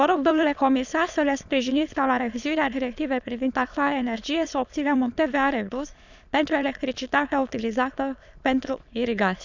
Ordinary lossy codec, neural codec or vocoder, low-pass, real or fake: none; autoencoder, 22.05 kHz, a latent of 192 numbers a frame, VITS, trained on many speakers; 7.2 kHz; fake